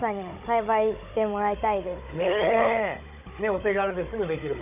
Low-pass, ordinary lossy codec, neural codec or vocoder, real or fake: 3.6 kHz; none; codec, 16 kHz, 16 kbps, FunCodec, trained on Chinese and English, 50 frames a second; fake